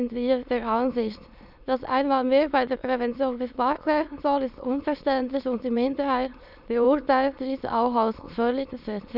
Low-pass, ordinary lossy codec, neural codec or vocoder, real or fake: 5.4 kHz; none; autoencoder, 22.05 kHz, a latent of 192 numbers a frame, VITS, trained on many speakers; fake